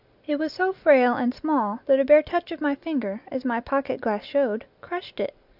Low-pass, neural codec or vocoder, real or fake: 5.4 kHz; none; real